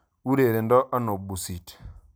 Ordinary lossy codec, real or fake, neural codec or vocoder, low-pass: none; real; none; none